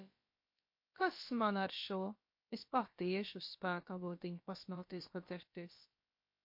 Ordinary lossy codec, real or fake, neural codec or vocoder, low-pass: MP3, 32 kbps; fake; codec, 16 kHz, about 1 kbps, DyCAST, with the encoder's durations; 5.4 kHz